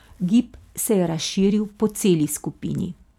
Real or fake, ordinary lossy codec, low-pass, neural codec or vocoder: real; none; 19.8 kHz; none